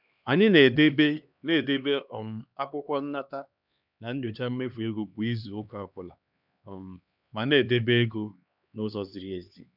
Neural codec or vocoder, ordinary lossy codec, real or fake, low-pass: codec, 16 kHz, 2 kbps, X-Codec, HuBERT features, trained on LibriSpeech; none; fake; 5.4 kHz